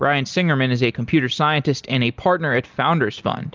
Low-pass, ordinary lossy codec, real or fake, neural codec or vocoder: 7.2 kHz; Opus, 32 kbps; real; none